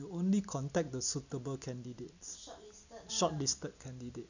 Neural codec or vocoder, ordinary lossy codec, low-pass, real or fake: none; none; 7.2 kHz; real